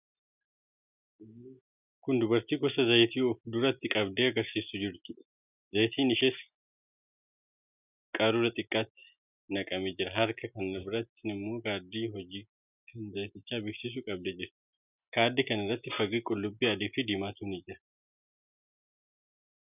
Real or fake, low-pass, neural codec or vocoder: real; 3.6 kHz; none